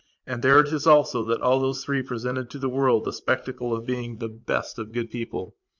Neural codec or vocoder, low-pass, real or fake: vocoder, 44.1 kHz, 80 mel bands, Vocos; 7.2 kHz; fake